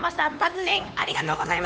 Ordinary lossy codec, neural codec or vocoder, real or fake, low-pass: none; codec, 16 kHz, 2 kbps, X-Codec, HuBERT features, trained on LibriSpeech; fake; none